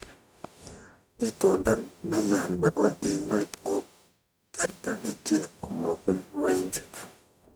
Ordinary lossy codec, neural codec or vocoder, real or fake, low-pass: none; codec, 44.1 kHz, 0.9 kbps, DAC; fake; none